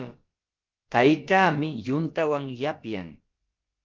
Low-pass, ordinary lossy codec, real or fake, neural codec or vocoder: 7.2 kHz; Opus, 32 kbps; fake; codec, 16 kHz, about 1 kbps, DyCAST, with the encoder's durations